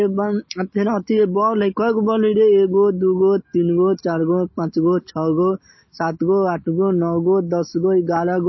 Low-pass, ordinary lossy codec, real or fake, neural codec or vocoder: 7.2 kHz; MP3, 24 kbps; real; none